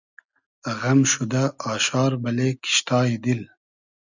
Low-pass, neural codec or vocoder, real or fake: 7.2 kHz; none; real